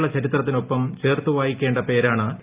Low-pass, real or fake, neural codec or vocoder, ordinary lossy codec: 3.6 kHz; real; none; Opus, 32 kbps